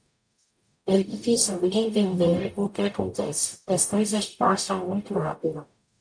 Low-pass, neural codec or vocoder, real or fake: 9.9 kHz; codec, 44.1 kHz, 0.9 kbps, DAC; fake